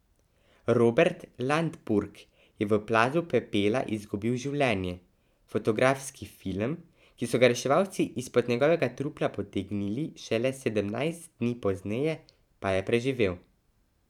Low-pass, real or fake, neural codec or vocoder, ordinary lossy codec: 19.8 kHz; fake; vocoder, 44.1 kHz, 128 mel bands every 512 samples, BigVGAN v2; none